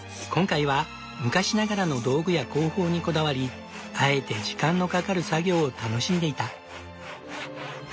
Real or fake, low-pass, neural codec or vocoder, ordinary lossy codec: real; none; none; none